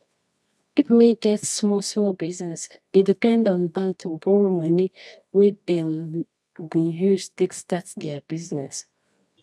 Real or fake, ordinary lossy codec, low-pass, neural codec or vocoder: fake; none; none; codec, 24 kHz, 0.9 kbps, WavTokenizer, medium music audio release